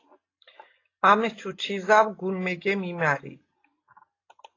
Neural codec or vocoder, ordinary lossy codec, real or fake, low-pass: none; AAC, 32 kbps; real; 7.2 kHz